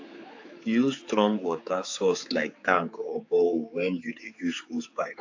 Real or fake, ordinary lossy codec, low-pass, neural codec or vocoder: fake; AAC, 48 kbps; 7.2 kHz; codec, 16 kHz, 4 kbps, X-Codec, HuBERT features, trained on general audio